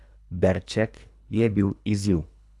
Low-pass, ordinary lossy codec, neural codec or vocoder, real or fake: none; none; codec, 24 kHz, 3 kbps, HILCodec; fake